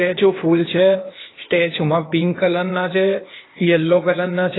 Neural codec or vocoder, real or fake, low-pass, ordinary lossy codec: codec, 16 kHz, 0.8 kbps, ZipCodec; fake; 7.2 kHz; AAC, 16 kbps